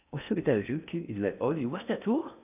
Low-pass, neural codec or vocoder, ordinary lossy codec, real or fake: 3.6 kHz; codec, 16 kHz in and 24 kHz out, 0.6 kbps, FocalCodec, streaming, 2048 codes; none; fake